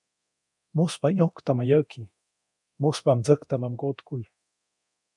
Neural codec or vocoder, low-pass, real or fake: codec, 24 kHz, 0.9 kbps, DualCodec; 10.8 kHz; fake